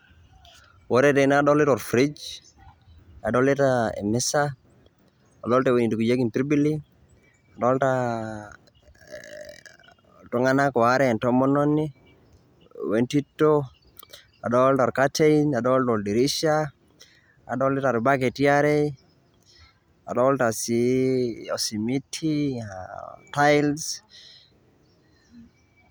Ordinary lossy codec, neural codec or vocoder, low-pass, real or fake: none; none; none; real